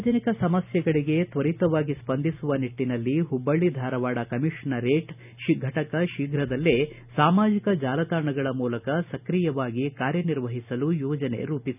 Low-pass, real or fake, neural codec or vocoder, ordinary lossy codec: 3.6 kHz; real; none; none